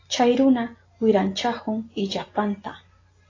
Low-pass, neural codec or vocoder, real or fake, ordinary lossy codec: 7.2 kHz; none; real; AAC, 32 kbps